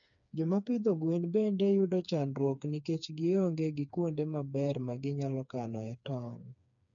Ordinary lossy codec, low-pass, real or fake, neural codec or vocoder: none; 7.2 kHz; fake; codec, 16 kHz, 4 kbps, FreqCodec, smaller model